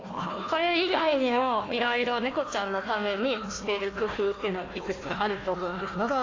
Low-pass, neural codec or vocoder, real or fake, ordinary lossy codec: 7.2 kHz; codec, 16 kHz, 1 kbps, FunCodec, trained on Chinese and English, 50 frames a second; fake; AAC, 32 kbps